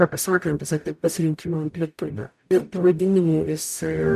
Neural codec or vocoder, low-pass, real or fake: codec, 44.1 kHz, 0.9 kbps, DAC; 14.4 kHz; fake